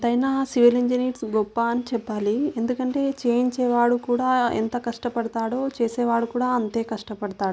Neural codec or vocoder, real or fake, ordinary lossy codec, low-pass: none; real; none; none